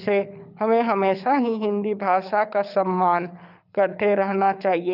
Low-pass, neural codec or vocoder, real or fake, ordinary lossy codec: 5.4 kHz; codec, 24 kHz, 6 kbps, HILCodec; fake; none